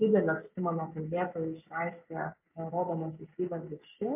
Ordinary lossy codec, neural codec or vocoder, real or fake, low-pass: MP3, 32 kbps; none; real; 3.6 kHz